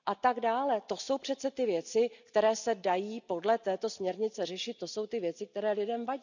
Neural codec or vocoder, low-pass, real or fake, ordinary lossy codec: none; 7.2 kHz; real; none